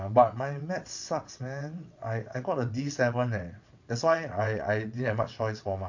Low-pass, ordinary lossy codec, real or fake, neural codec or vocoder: 7.2 kHz; MP3, 64 kbps; fake; vocoder, 22.05 kHz, 80 mel bands, WaveNeXt